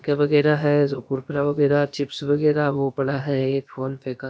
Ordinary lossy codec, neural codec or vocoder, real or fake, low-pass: none; codec, 16 kHz, about 1 kbps, DyCAST, with the encoder's durations; fake; none